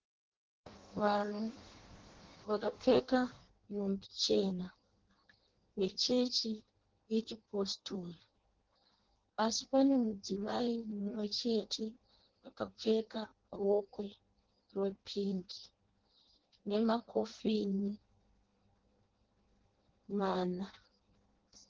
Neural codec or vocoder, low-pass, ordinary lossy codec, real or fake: codec, 16 kHz in and 24 kHz out, 0.6 kbps, FireRedTTS-2 codec; 7.2 kHz; Opus, 16 kbps; fake